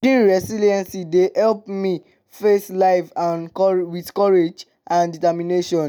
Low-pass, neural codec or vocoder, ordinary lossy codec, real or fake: none; none; none; real